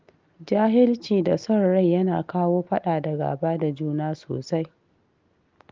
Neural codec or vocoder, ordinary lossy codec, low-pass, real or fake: none; Opus, 32 kbps; 7.2 kHz; real